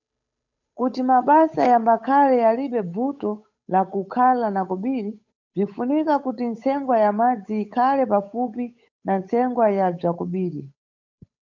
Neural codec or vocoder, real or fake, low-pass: codec, 16 kHz, 8 kbps, FunCodec, trained on Chinese and English, 25 frames a second; fake; 7.2 kHz